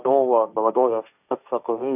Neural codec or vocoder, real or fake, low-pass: codec, 16 kHz, 1.1 kbps, Voila-Tokenizer; fake; 3.6 kHz